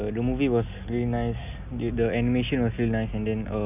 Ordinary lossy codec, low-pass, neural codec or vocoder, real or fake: none; 3.6 kHz; none; real